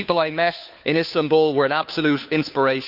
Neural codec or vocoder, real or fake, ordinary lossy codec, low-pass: codec, 16 kHz, 2 kbps, FunCodec, trained on Chinese and English, 25 frames a second; fake; none; 5.4 kHz